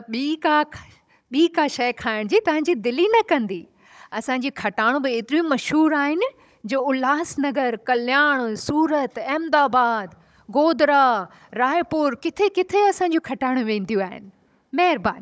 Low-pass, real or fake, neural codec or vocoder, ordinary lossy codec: none; fake; codec, 16 kHz, 16 kbps, FunCodec, trained on Chinese and English, 50 frames a second; none